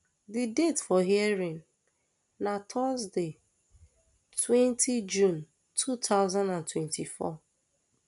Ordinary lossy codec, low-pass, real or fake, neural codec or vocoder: none; 10.8 kHz; real; none